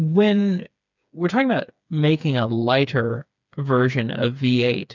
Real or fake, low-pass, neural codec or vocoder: fake; 7.2 kHz; codec, 16 kHz, 4 kbps, FreqCodec, smaller model